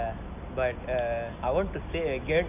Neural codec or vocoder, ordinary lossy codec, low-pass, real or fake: none; none; 3.6 kHz; real